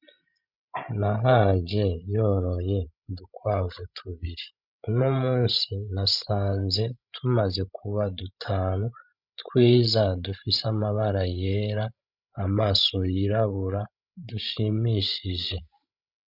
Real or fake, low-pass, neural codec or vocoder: fake; 5.4 kHz; codec, 16 kHz, 16 kbps, FreqCodec, larger model